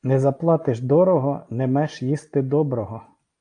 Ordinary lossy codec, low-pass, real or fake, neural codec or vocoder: AAC, 48 kbps; 9.9 kHz; real; none